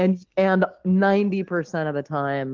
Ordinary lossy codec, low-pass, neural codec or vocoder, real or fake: Opus, 16 kbps; 7.2 kHz; none; real